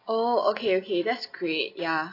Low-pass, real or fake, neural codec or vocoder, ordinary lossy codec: 5.4 kHz; real; none; AAC, 32 kbps